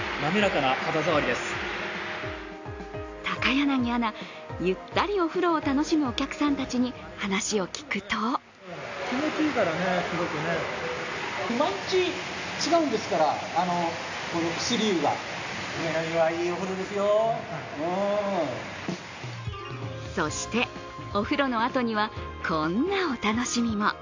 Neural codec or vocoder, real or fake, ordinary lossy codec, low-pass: none; real; AAC, 48 kbps; 7.2 kHz